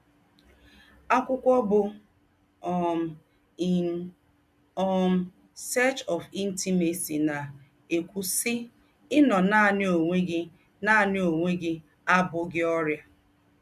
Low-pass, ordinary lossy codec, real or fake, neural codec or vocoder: 14.4 kHz; MP3, 96 kbps; real; none